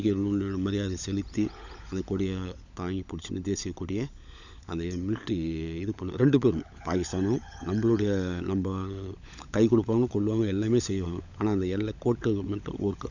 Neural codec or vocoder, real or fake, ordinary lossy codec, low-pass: codec, 16 kHz, 16 kbps, FunCodec, trained on LibriTTS, 50 frames a second; fake; none; 7.2 kHz